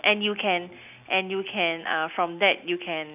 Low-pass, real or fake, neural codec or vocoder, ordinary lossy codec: 3.6 kHz; real; none; none